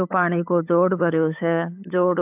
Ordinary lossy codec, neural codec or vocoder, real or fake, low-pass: AAC, 32 kbps; codec, 16 kHz, 8 kbps, FunCodec, trained on LibriTTS, 25 frames a second; fake; 3.6 kHz